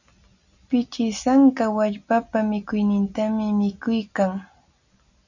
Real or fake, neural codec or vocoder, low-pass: real; none; 7.2 kHz